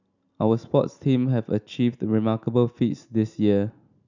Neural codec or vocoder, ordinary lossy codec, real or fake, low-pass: none; none; real; 7.2 kHz